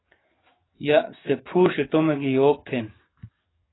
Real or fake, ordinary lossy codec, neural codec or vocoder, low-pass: fake; AAC, 16 kbps; codec, 44.1 kHz, 7.8 kbps, DAC; 7.2 kHz